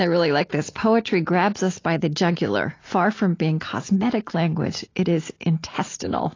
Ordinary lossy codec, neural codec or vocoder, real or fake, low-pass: AAC, 32 kbps; none; real; 7.2 kHz